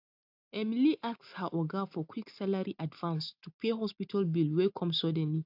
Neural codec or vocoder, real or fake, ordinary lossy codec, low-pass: none; real; AAC, 48 kbps; 5.4 kHz